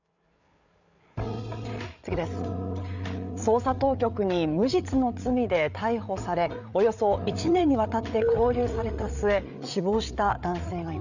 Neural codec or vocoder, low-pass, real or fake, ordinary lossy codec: codec, 16 kHz, 8 kbps, FreqCodec, larger model; 7.2 kHz; fake; none